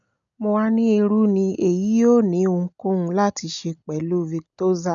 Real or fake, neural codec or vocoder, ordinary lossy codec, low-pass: real; none; none; 7.2 kHz